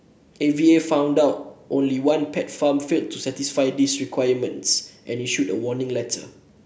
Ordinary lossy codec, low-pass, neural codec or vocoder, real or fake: none; none; none; real